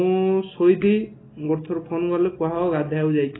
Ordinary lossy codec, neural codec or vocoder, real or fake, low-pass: AAC, 16 kbps; none; real; 7.2 kHz